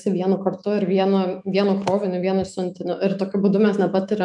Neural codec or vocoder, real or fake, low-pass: autoencoder, 48 kHz, 128 numbers a frame, DAC-VAE, trained on Japanese speech; fake; 10.8 kHz